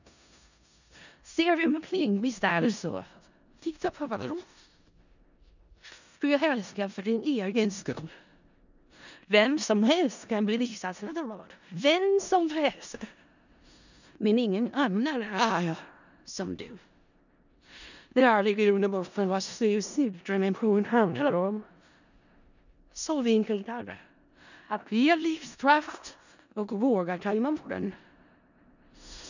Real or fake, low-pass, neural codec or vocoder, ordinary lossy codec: fake; 7.2 kHz; codec, 16 kHz in and 24 kHz out, 0.4 kbps, LongCat-Audio-Codec, four codebook decoder; none